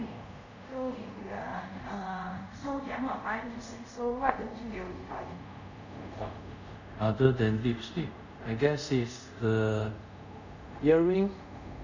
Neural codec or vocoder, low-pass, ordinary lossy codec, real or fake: codec, 24 kHz, 0.5 kbps, DualCodec; 7.2 kHz; none; fake